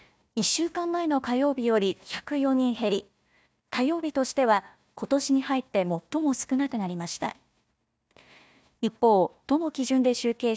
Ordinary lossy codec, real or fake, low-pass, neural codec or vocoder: none; fake; none; codec, 16 kHz, 1 kbps, FunCodec, trained on Chinese and English, 50 frames a second